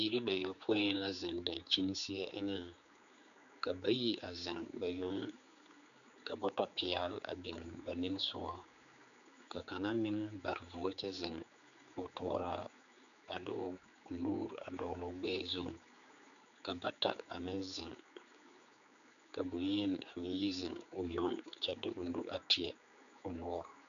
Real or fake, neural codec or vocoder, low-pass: fake; codec, 16 kHz, 4 kbps, X-Codec, HuBERT features, trained on general audio; 7.2 kHz